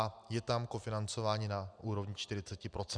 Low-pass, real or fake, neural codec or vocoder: 9.9 kHz; real; none